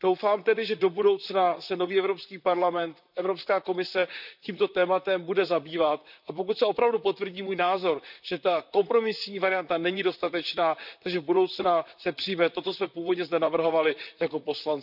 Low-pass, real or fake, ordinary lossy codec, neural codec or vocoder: 5.4 kHz; fake; none; vocoder, 44.1 kHz, 128 mel bands, Pupu-Vocoder